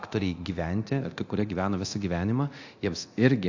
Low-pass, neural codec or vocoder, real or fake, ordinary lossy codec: 7.2 kHz; codec, 24 kHz, 0.9 kbps, DualCodec; fake; AAC, 48 kbps